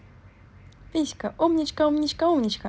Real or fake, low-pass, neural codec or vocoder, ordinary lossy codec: real; none; none; none